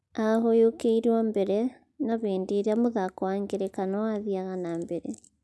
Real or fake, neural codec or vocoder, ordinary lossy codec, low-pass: real; none; none; none